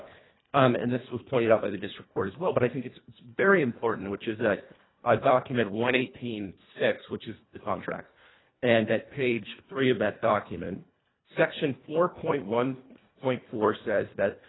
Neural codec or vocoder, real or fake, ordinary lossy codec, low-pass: codec, 24 kHz, 1.5 kbps, HILCodec; fake; AAC, 16 kbps; 7.2 kHz